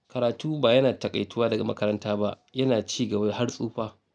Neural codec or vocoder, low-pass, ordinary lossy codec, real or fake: none; none; none; real